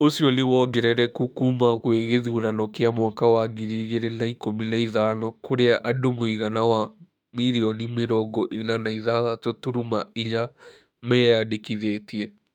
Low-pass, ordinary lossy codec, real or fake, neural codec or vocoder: 19.8 kHz; none; fake; autoencoder, 48 kHz, 32 numbers a frame, DAC-VAE, trained on Japanese speech